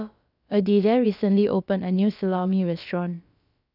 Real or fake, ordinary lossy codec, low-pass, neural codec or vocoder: fake; none; 5.4 kHz; codec, 16 kHz, about 1 kbps, DyCAST, with the encoder's durations